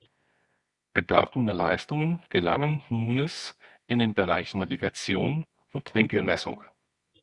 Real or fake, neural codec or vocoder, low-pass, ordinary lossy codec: fake; codec, 24 kHz, 0.9 kbps, WavTokenizer, medium music audio release; 10.8 kHz; Opus, 64 kbps